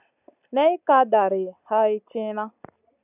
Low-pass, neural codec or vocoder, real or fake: 3.6 kHz; none; real